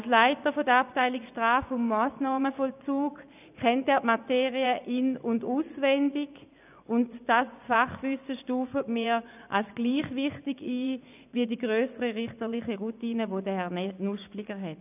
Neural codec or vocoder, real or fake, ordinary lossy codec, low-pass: vocoder, 24 kHz, 100 mel bands, Vocos; fake; none; 3.6 kHz